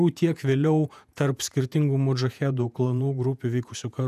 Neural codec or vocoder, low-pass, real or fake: vocoder, 48 kHz, 128 mel bands, Vocos; 14.4 kHz; fake